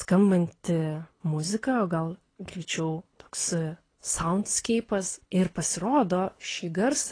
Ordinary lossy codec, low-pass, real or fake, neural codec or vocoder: AAC, 32 kbps; 9.9 kHz; fake; codec, 24 kHz, 6 kbps, HILCodec